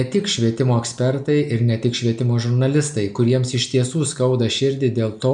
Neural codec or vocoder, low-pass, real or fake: none; 9.9 kHz; real